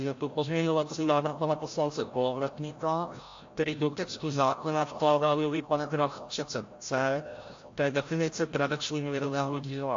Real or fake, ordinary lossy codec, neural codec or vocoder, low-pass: fake; AAC, 48 kbps; codec, 16 kHz, 0.5 kbps, FreqCodec, larger model; 7.2 kHz